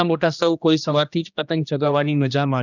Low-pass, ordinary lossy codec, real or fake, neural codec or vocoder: 7.2 kHz; none; fake; codec, 16 kHz, 1 kbps, X-Codec, HuBERT features, trained on general audio